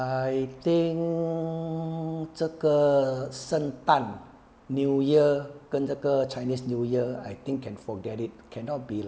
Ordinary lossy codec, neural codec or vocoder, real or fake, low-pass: none; none; real; none